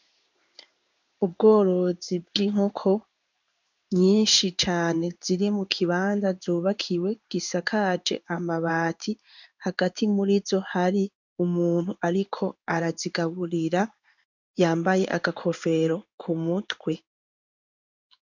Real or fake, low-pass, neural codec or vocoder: fake; 7.2 kHz; codec, 16 kHz in and 24 kHz out, 1 kbps, XY-Tokenizer